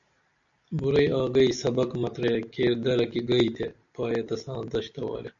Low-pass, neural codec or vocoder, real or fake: 7.2 kHz; none; real